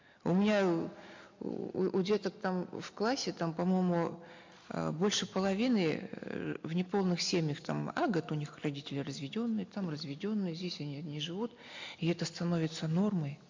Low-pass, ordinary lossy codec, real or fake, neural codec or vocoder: 7.2 kHz; MP3, 48 kbps; real; none